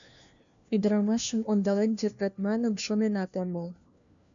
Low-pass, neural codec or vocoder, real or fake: 7.2 kHz; codec, 16 kHz, 1 kbps, FunCodec, trained on LibriTTS, 50 frames a second; fake